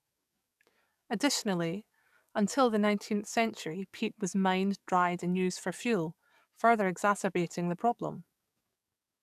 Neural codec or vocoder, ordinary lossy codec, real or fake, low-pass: codec, 44.1 kHz, 7.8 kbps, DAC; none; fake; 14.4 kHz